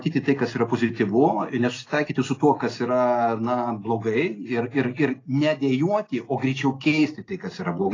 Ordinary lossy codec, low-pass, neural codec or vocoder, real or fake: AAC, 32 kbps; 7.2 kHz; none; real